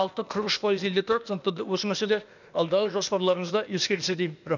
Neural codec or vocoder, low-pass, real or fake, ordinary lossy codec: codec, 16 kHz, 0.8 kbps, ZipCodec; 7.2 kHz; fake; none